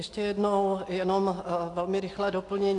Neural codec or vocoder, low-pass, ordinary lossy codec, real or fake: none; 10.8 kHz; AAC, 48 kbps; real